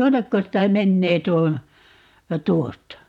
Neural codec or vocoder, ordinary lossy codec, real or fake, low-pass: vocoder, 44.1 kHz, 128 mel bands, Pupu-Vocoder; none; fake; 19.8 kHz